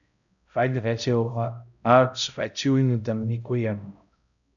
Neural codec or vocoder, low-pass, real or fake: codec, 16 kHz, 0.5 kbps, X-Codec, HuBERT features, trained on balanced general audio; 7.2 kHz; fake